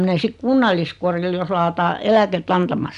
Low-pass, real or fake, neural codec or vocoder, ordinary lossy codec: 10.8 kHz; real; none; none